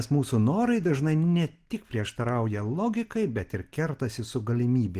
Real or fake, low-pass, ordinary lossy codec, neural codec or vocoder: real; 14.4 kHz; Opus, 16 kbps; none